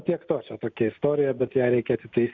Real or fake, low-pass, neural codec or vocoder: real; 7.2 kHz; none